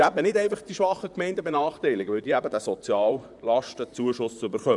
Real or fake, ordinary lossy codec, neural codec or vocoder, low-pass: fake; none; vocoder, 44.1 kHz, 128 mel bands, Pupu-Vocoder; 10.8 kHz